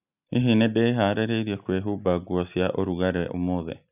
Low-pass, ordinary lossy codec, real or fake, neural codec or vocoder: 3.6 kHz; none; real; none